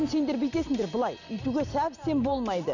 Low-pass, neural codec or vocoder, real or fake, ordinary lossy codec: 7.2 kHz; none; real; none